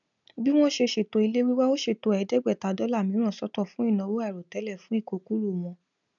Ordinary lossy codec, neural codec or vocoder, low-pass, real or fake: none; none; 7.2 kHz; real